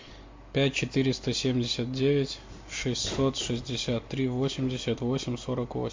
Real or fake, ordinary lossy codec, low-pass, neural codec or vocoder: real; MP3, 48 kbps; 7.2 kHz; none